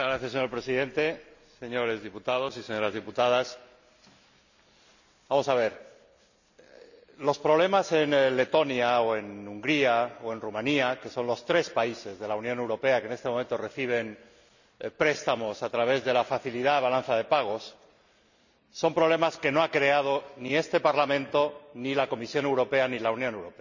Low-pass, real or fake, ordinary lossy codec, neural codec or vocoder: 7.2 kHz; real; none; none